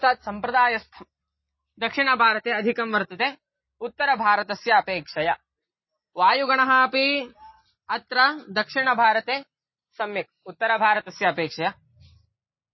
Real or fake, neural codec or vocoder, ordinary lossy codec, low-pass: real; none; MP3, 24 kbps; 7.2 kHz